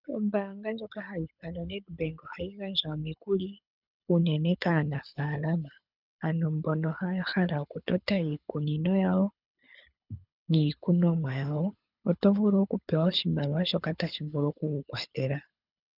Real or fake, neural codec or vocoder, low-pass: fake; codec, 24 kHz, 6 kbps, HILCodec; 5.4 kHz